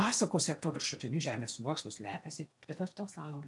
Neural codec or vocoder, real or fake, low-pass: codec, 16 kHz in and 24 kHz out, 0.8 kbps, FocalCodec, streaming, 65536 codes; fake; 10.8 kHz